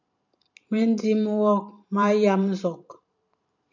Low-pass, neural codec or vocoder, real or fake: 7.2 kHz; vocoder, 44.1 kHz, 128 mel bands every 512 samples, BigVGAN v2; fake